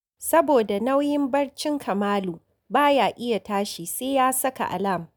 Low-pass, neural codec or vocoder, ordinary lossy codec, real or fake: none; none; none; real